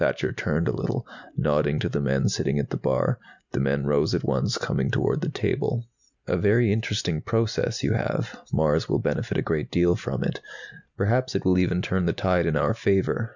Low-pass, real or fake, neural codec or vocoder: 7.2 kHz; real; none